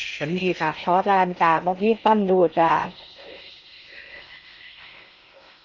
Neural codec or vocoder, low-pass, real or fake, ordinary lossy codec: codec, 16 kHz in and 24 kHz out, 0.6 kbps, FocalCodec, streaming, 2048 codes; 7.2 kHz; fake; none